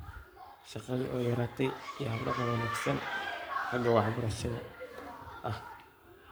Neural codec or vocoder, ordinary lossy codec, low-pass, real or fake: codec, 44.1 kHz, 7.8 kbps, Pupu-Codec; none; none; fake